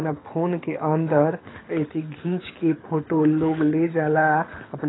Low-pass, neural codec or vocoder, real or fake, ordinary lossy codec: 7.2 kHz; codec, 24 kHz, 6 kbps, HILCodec; fake; AAC, 16 kbps